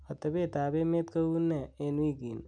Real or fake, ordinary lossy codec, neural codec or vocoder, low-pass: real; none; none; 9.9 kHz